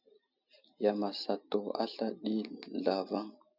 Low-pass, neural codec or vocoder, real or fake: 5.4 kHz; none; real